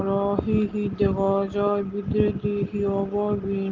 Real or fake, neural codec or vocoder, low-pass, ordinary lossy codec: real; none; 7.2 kHz; Opus, 16 kbps